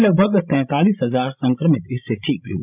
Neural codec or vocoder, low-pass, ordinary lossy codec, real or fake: codec, 16 kHz, 16 kbps, FreqCodec, larger model; 3.6 kHz; none; fake